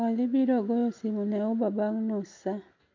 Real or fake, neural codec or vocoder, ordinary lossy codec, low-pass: real; none; MP3, 64 kbps; 7.2 kHz